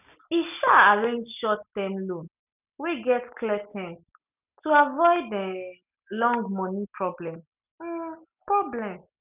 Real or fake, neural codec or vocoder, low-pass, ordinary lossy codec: real; none; 3.6 kHz; none